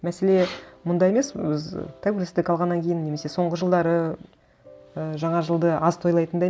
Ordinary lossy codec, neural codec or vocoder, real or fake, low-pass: none; none; real; none